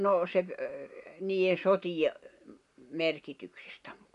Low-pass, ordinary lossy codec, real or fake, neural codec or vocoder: 10.8 kHz; MP3, 96 kbps; real; none